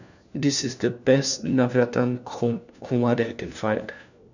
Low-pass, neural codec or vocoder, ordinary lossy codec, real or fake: 7.2 kHz; codec, 16 kHz, 1 kbps, FunCodec, trained on LibriTTS, 50 frames a second; none; fake